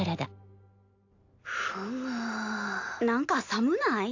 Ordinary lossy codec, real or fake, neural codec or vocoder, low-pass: AAC, 48 kbps; real; none; 7.2 kHz